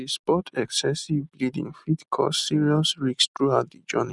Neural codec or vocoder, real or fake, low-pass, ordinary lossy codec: none; real; 10.8 kHz; none